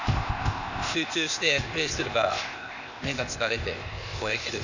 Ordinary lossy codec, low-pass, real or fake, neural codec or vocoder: none; 7.2 kHz; fake; codec, 16 kHz, 0.8 kbps, ZipCodec